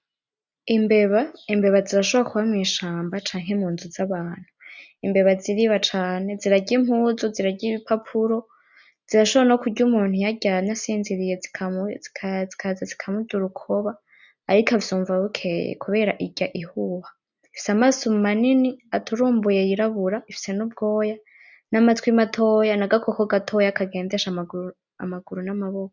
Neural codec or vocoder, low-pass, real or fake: none; 7.2 kHz; real